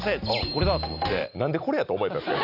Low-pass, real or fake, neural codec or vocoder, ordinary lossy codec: 5.4 kHz; real; none; none